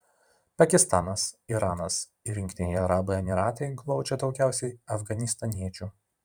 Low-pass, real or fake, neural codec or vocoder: 19.8 kHz; fake; vocoder, 44.1 kHz, 128 mel bands every 512 samples, BigVGAN v2